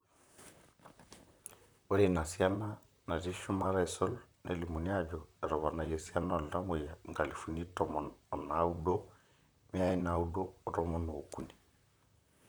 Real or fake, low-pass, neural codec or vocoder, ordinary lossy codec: fake; none; vocoder, 44.1 kHz, 128 mel bands, Pupu-Vocoder; none